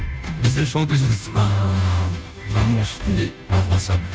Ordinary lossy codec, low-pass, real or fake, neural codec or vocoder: none; none; fake; codec, 16 kHz, 0.5 kbps, FunCodec, trained on Chinese and English, 25 frames a second